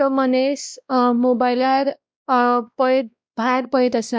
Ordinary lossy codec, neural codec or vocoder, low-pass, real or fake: none; codec, 16 kHz, 1 kbps, X-Codec, WavLM features, trained on Multilingual LibriSpeech; none; fake